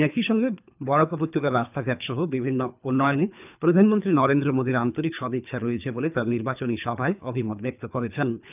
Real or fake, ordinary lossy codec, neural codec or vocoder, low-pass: fake; none; codec, 24 kHz, 3 kbps, HILCodec; 3.6 kHz